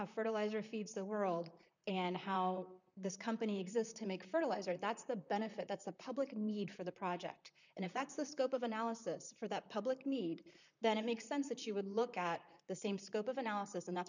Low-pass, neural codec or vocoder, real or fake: 7.2 kHz; vocoder, 44.1 kHz, 128 mel bands, Pupu-Vocoder; fake